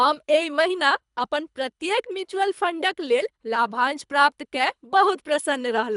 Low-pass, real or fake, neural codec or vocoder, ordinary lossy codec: 10.8 kHz; fake; codec, 24 kHz, 3 kbps, HILCodec; none